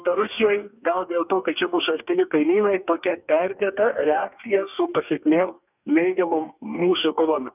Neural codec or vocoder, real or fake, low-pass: codec, 44.1 kHz, 2.6 kbps, DAC; fake; 3.6 kHz